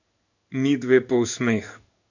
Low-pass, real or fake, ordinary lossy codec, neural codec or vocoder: 7.2 kHz; fake; none; codec, 16 kHz in and 24 kHz out, 1 kbps, XY-Tokenizer